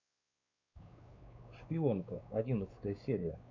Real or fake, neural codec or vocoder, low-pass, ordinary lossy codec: fake; codec, 16 kHz, 4 kbps, X-Codec, WavLM features, trained on Multilingual LibriSpeech; 7.2 kHz; MP3, 48 kbps